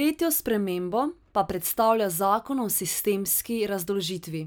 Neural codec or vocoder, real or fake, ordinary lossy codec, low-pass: none; real; none; none